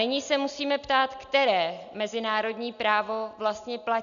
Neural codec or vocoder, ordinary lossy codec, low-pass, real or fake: none; AAC, 96 kbps; 7.2 kHz; real